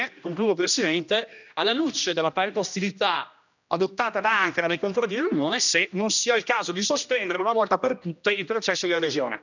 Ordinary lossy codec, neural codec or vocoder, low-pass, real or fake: none; codec, 16 kHz, 1 kbps, X-Codec, HuBERT features, trained on general audio; 7.2 kHz; fake